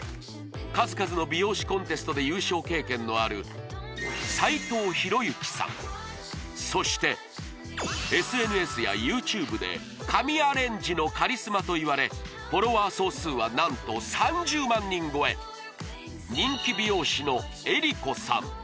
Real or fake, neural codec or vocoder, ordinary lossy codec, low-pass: real; none; none; none